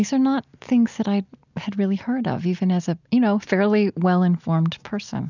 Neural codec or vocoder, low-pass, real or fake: none; 7.2 kHz; real